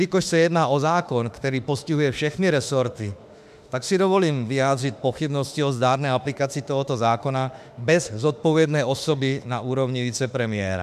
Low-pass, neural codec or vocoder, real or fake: 14.4 kHz; autoencoder, 48 kHz, 32 numbers a frame, DAC-VAE, trained on Japanese speech; fake